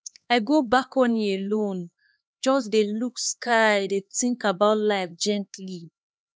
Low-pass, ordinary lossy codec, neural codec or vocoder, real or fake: none; none; codec, 16 kHz, 2 kbps, X-Codec, HuBERT features, trained on LibriSpeech; fake